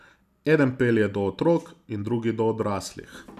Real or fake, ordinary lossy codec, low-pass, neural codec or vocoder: real; none; 14.4 kHz; none